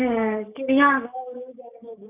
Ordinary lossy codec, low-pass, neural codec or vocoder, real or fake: none; 3.6 kHz; codec, 16 kHz, 6 kbps, DAC; fake